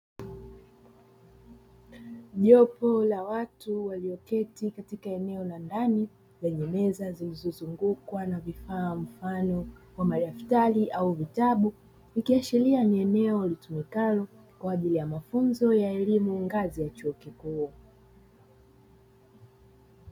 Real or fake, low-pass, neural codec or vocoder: real; 19.8 kHz; none